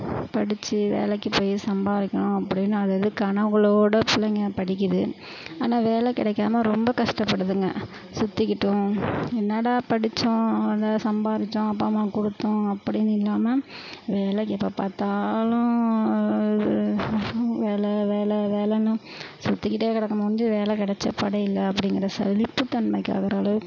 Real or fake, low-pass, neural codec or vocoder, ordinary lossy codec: real; 7.2 kHz; none; none